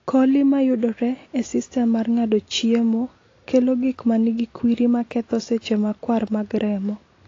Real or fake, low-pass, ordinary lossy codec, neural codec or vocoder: real; 7.2 kHz; AAC, 32 kbps; none